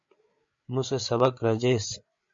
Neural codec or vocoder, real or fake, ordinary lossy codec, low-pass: codec, 16 kHz, 8 kbps, FreqCodec, larger model; fake; MP3, 48 kbps; 7.2 kHz